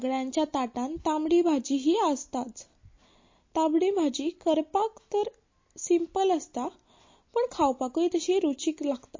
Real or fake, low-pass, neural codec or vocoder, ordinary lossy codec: real; 7.2 kHz; none; MP3, 32 kbps